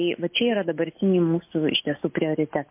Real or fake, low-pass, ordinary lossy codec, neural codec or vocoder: real; 3.6 kHz; MP3, 24 kbps; none